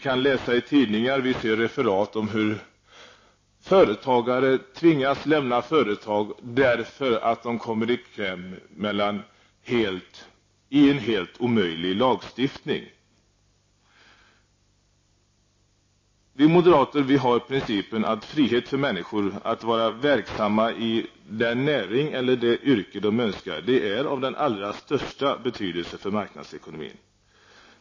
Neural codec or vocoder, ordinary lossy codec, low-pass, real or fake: none; MP3, 32 kbps; 7.2 kHz; real